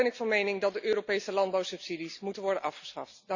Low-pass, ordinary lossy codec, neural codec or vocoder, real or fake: 7.2 kHz; Opus, 64 kbps; none; real